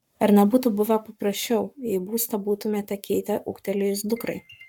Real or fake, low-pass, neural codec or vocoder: fake; 19.8 kHz; codec, 44.1 kHz, 7.8 kbps, Pupu-Codec